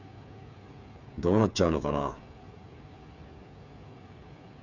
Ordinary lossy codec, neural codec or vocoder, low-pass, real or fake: none; codec, 16 kHz, 8 kbps, FreqCodec, smaller model; 7.2 kHz; fake